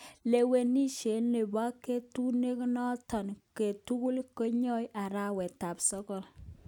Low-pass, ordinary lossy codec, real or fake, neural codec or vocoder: 19.8 kHz; none; real; none